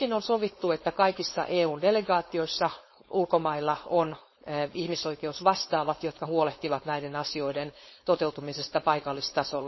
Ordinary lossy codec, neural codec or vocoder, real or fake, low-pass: MP3, 24 kbps; codec, 16 kHz, 4.8 kbps, FACodec; fake; 7.2 kHz